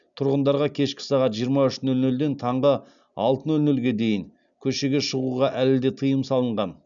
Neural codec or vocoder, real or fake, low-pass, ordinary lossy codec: none; real; 7.2 kHz; none